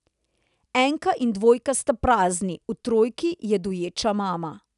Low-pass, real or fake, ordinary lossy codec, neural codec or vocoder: 10.8 kHz; real; none; none